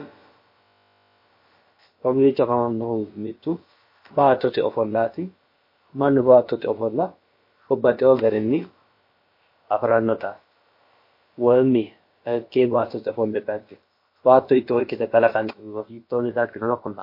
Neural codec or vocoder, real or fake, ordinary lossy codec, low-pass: codec, 16 kHz, about 1 kbps, DyCAST, with the encoder's durations; fake; MP3, 32 kbps; 5.4 kHz